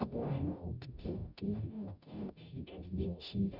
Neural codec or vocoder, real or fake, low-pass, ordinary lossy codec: codec, 44.1 kHz, 0.9 kbps, DAC; fake; 5.4 kHz; none